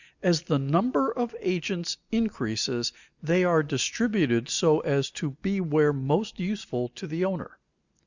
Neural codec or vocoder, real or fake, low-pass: none; real; 7.2 kHz